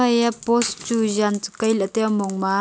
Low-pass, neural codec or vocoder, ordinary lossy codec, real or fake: none; none; none; real